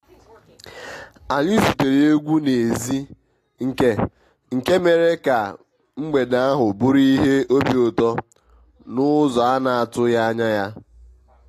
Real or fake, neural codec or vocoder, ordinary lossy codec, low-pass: real; none; AAC, 48 kbps; 14.4 kHz